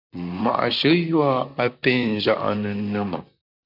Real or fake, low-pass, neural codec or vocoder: fake; 5.4 kHz; vocoder, 44.1 kHz, 128 mel bands, Pupu-Vocoder